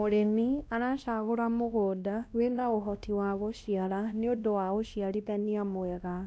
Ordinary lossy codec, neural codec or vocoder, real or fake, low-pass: none; codec, 16 kHz, 1 kbps, X-Codec, WavLM features, trained on Multilingual LibriSpeech; fake; none